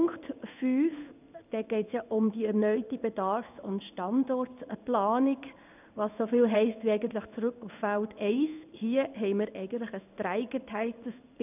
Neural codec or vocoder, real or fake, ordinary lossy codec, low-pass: codec, 16 kHz in and 24 kHz out, 1 kbps, XY-Tokenizer; fake; none; 3.6 kHz